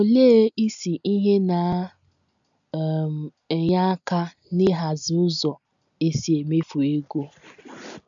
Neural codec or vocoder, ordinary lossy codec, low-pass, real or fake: none; none; 7.2 kHz; real